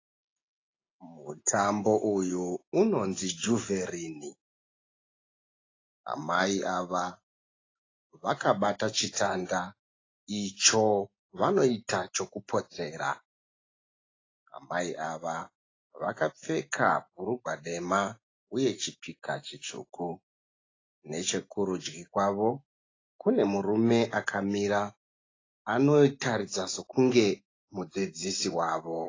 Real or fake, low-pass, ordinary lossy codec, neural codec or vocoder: real; 7.2 kHz; AAC, 32 kbps; none